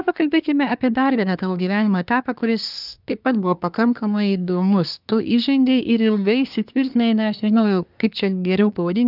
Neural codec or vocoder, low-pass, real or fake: codec, 24 kHz, 1 kbps, SNAC; 5.4 kHz; fake